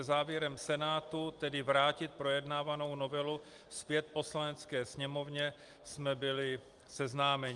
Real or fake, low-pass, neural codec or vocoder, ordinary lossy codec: real; 10.8 kHz; none; Opus, 32 kbps